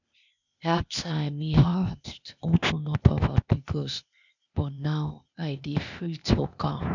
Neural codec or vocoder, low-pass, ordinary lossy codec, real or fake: codec, 16 kHz, 0.8 kbps, ZipCodec; 7.2 kHz; none; fake